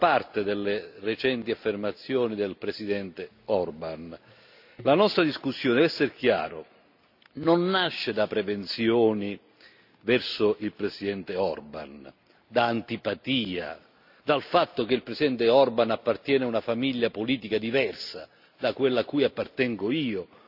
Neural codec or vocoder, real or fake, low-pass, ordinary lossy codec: none; real; 5.4 kHz; AAC, 48 kbps